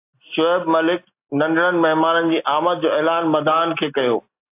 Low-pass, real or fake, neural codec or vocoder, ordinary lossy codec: 3.6 kHz; real; none; AAC, 24 kbps